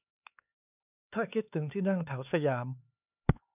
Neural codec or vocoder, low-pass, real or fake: codec, 16 kHz, 4 kbps, X-Codec, HuBERT features, trained on LibriSpeech; 3.6 kHz; fake